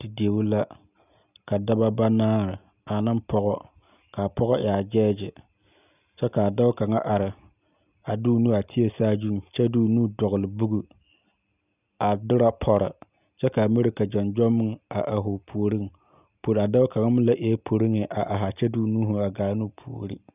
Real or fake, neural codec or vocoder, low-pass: real; none; 3.6 kHz